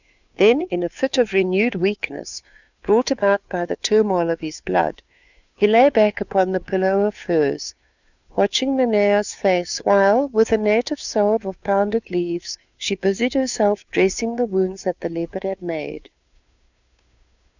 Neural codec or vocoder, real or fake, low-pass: codec, 16 kHz, 2 kbps, FunCodec, trained on Chinese and English, 25 frames a second; fake; 7.2 kHz